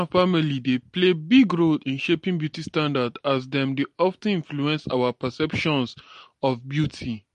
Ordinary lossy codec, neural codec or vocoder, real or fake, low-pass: MP3, 48 kbps; none; real; 14.4 kHz